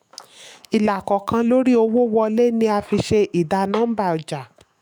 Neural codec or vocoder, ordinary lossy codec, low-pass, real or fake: autoencoder, 48 kHz, 128 numbers a frame, DAC-VAE, trained on Japanese speech; none; none; fake